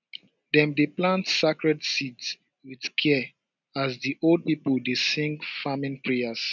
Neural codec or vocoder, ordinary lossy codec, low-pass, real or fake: none; none; 7.2 kHz; real